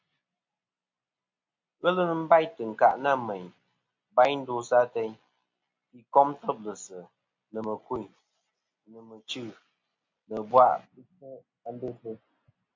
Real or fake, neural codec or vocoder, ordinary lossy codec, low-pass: real; none; MP3, 48 kbps; 7.2 kHz